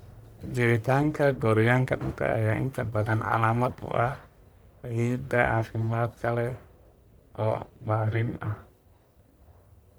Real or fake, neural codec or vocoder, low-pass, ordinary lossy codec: fake; codec, 44.1 kHz, 1.7 kbps, Pupu-Codec; none; none